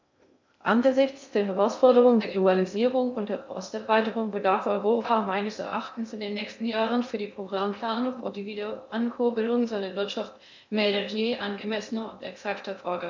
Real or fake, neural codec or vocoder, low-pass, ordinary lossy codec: fake; codec, 16 kHz in and 24 kHz out, 0.6 kbps, FocalCodec, streaming, 2048 codes; 7.2 kHz; MP3, 64 kbps